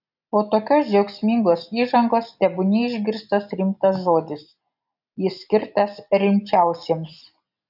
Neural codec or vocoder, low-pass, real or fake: none; 5.4 kHz; real